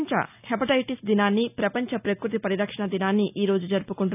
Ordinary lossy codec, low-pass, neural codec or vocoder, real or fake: none; 3.6 kHz; none; real